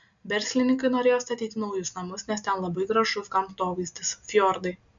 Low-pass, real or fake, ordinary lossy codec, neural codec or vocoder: 7.2 kHz; real; MP3, 64 kbps; none